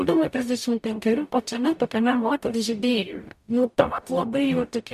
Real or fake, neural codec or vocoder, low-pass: fake; codec, 44.1 kHz, 0.9 kbps, DAC; 14.4 kHz